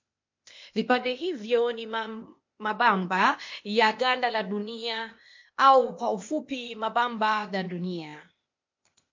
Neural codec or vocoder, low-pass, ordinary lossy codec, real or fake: codec, 16 kHz, 0.8 kbps, ZipCodec; 7.2 kHz; MP3, 48 kbps; fake